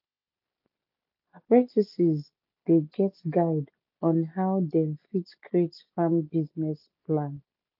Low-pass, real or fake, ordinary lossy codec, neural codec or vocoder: 5.4 kHz; real; AAC, 48 kbps; none